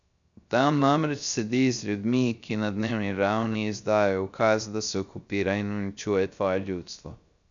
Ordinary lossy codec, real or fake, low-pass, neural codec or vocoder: none; fake; 7.2 kHz; codec, 16 kHz, 0.3 kbps, FocalCodec